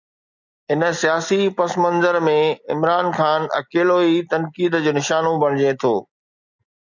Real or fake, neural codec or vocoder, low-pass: real; none; 7.2 kHz